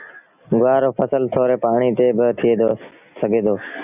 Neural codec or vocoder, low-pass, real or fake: none; 3.6 kHz; real